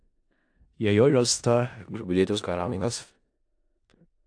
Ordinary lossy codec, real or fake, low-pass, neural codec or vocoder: AAC, 64 kbps; fake; 9.9 kHz; codec, 16 kHz in and 24 kHz out, 0.4 kbps, LongCat-Audio-Codec, four codebook decoder